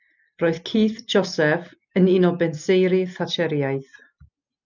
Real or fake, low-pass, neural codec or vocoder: real; 7.2 kHz; none